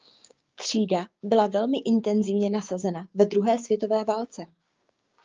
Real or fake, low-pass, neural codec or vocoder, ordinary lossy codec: fake; 7.2 kHz; codec, 16 kHz, 6 kbps, DAC; Opus, 24 kbps